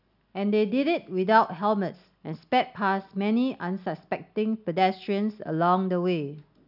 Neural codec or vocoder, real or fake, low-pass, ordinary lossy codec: none; real; 5.4 kHz; none